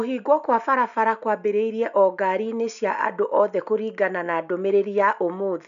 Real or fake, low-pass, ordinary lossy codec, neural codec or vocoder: real; 7.2 kHz; none; none